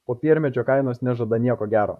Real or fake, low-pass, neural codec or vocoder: fake; 14.4 kHz; vocoder, 44.1 kHz, 128 mel bands, Pupu-Vocoder